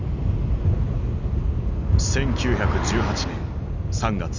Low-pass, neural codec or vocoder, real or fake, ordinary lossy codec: 7.2 kHz; none; real; none